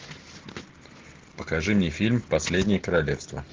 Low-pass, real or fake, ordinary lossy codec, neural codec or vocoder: 7.2 kHz; real; Opus, 16 kbps; none